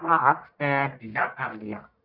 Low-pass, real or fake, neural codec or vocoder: 5.4 kHz; fake; codec, 44.1 kHz, 1.7 kbps, Pupu-Codec